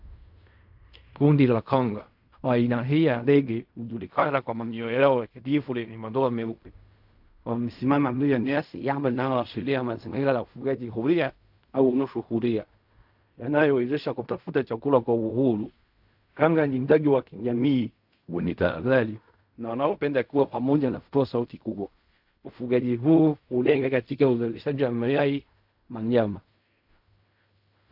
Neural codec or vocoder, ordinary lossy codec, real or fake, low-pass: codec, 16 kHz in and 24 kHz out, 0.4 kbps, LongCat-Audio-Codec, fine tuned four codebook decoder; AAC, 48 kbps; fake; 5.4 kHz